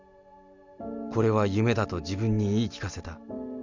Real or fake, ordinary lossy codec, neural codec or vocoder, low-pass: real; none; none; 7.2 kHz